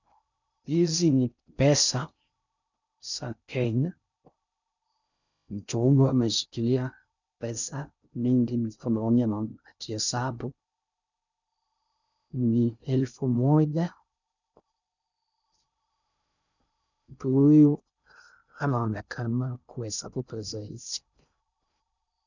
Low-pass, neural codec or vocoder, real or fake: 7.2 kHz; codec, 16 kHz in and 24 kHz out, 0.6 kbps, FocalCodec, streaming, 2048 codes; fake